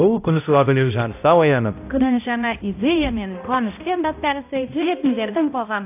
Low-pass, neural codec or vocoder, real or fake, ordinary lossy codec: 3.6 kHz; codec, 16 kHz, 0.5 kbps, X-Codec, HuBERT features, trained on balanced general audio; fake; AAC, 32 kbps